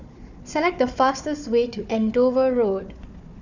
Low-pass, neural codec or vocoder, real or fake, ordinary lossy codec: 7.2 kHz; codec, 16 kHz, 4 kbps, FunCodec, trained on Chinese and English, 50 frames a second; fake; none